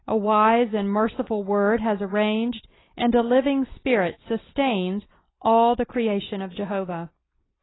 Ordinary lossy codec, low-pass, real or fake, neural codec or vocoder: AAC, 16 kbps; 7.2 kHz; real; none